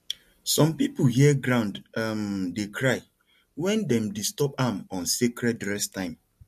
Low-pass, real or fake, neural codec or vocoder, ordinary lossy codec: 14.4 kHz; real; none; MP3, 64 kbps